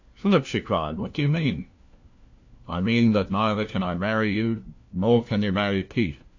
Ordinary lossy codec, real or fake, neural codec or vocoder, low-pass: AAC, 48 kbps; fake; codec, 16 kHz, 1 kbps, FunCodec, trained on LibriTTS, 50 frames a second; 7.2 kHz